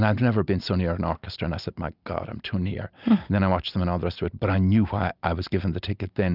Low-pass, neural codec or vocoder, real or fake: 5.4 kHz; none; real